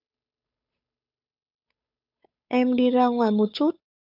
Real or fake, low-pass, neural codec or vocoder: fake; 5.4 kHz; codec, 16 kHz, 8 kbps, FunCodec, trained on Chinese and English, 25 frames a second